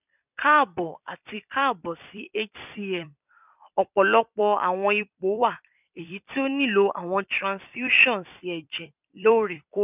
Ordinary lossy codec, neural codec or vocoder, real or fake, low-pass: none; vocoder, 44.1 kHz, 128 mel bands every 256 samples, BigVGAN v2; fake; 3.6 kHz